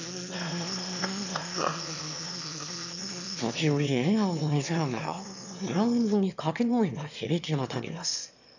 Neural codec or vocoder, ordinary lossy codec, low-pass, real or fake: autoencoder, 22.05 kHz, a latent of 192 numbers a frame, VITS, trained on one speaker; none; 7.2 kHz; fake